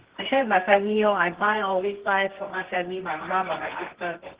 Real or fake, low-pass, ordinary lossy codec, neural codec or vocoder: fake; 3.6 kHz; Opus, 16 kbps; codec, 24 kHz, 0.9 kbps, WavTokenizer, medium music audio release